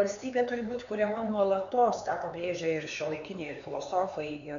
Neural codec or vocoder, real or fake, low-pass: codec, 16 kHz, 4 kbps, X-Codec, HuBERT features, trained on LibriSpeech; fake; 7.2 kHz